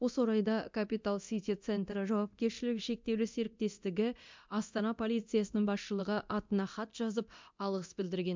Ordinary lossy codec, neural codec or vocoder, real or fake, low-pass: MP3, 64 kbps; codec, 24 kHz, 0.9 kbps, DualCodec; fake; 7.2 kHz